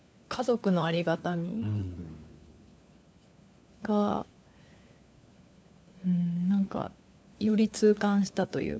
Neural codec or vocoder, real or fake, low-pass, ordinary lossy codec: codec, 16 kHz, 4 kbps, FunCodec, trained on LibriTTS, 50 frames a second; fake; none; none